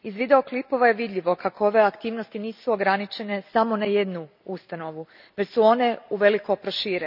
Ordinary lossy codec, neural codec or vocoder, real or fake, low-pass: none; none; real; 5.4 kHz